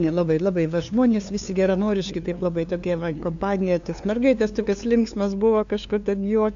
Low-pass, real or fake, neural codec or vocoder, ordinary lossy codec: 7.2 kHz; fake; codec, 16 kHz, 2 kbps, FunCodec, trained on LibriTTS, 25 frames a second; AAC, 64 kbps